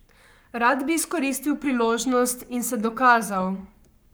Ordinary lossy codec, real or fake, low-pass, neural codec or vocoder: none; fake; none; codec, 44.1 kHz, 7.8 kbps, Pupu-Codec